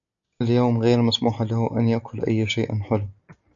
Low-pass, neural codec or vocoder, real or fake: 7.2 kHz; none; real